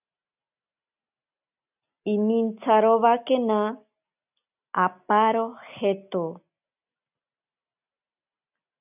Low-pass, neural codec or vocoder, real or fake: 3.6 kHz; none; real